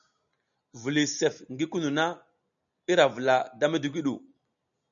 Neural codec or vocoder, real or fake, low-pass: none; real; 7.2 kHz